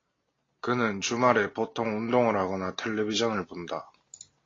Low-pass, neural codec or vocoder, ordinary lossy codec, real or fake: 7.2 kHz; none; AAC, 32 kbps; real